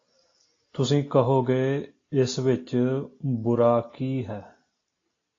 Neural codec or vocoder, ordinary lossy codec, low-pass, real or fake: none; AAC, 32 kbps; 7.2 kHz; real